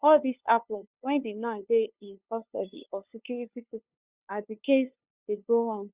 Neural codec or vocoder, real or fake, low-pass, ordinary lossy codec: codec, 16 kHz, 2 kbps, FunCodec, trained on LibriTTS, 25 frames a second; fake; 3.6 kHz; Opus, 64 kbps